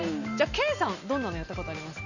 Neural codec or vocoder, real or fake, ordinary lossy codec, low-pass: none; real; none; 7.2 kHz